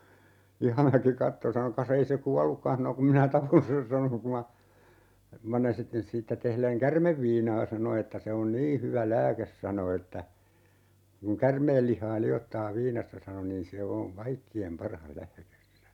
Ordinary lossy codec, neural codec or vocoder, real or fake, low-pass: none; none; real; 19.8 kHz